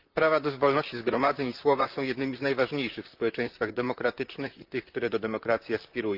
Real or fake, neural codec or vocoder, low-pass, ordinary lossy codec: fake; vocoder, 44.1 kHz, 128 mel bands, Pupu-Vocoder; 5.4 kHz; Opus, 24 kbps